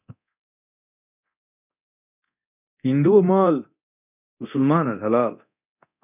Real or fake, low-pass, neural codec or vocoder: fake; 3.6 kHz; codec, 24 kHz, 0.9 kbps, DualCodec